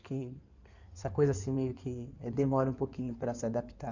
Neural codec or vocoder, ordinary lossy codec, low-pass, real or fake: codec, 16 kHz in and 24 kHz out, 2.2 kbps, FireRedTTS-2 codec; none; 7.2 kHz; fake